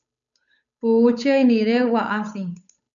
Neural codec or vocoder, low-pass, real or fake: codec, 16 kHz, 8 kbps, FunCodec, trained on Chinese and English, 25 frames a second; 7.2 kHz; fake